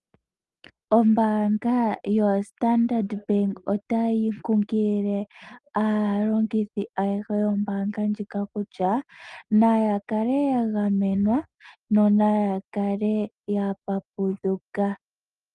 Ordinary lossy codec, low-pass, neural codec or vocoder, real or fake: Opus, 32 kbps; 10.8 kHz; none; real